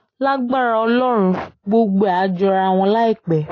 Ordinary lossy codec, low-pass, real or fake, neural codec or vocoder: AAC, 32 kbps; 7.2 kHz; real; none